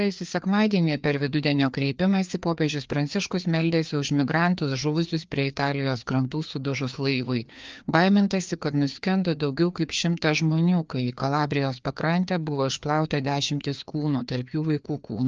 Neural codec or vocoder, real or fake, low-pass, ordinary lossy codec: codec, 16 kHz, 2 kbps, FreqCodec, larger model; fake; 7.2 kHz; Opus, 24 kbps